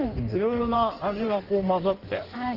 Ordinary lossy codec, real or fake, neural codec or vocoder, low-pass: Opus, 16 kbps; fake; codec, 16 kHz in and 24 kHz out, 1.1 kbps, FireRedTTS-2 codec; 5.4 kHz